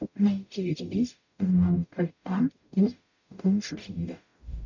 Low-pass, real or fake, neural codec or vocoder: 7.2 kHz; fake; codec, 44.1 kHz, 0.9 kbps, DAC